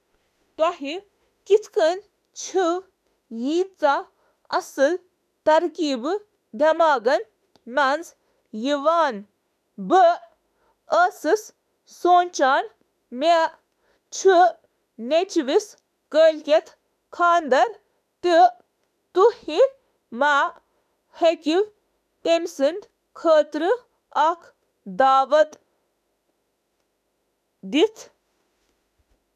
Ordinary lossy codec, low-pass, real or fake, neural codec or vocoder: none; 14.4 kHz; fake; autoencoder, 48 kHz, 32 numbers a frame, DAC-VAE, trained on Japanese speech